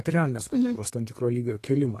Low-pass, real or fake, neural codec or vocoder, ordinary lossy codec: 14.4 kHz; fake; codec, 32 kHz, 1.9 kbps, SNAC; AAC, 48 kbps